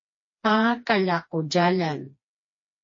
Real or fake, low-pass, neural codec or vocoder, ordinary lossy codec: fake; 7.2 kHz; codec, 16 kHz, 2 kbps, FreqCodec, smaller model; MP3, 32 kbps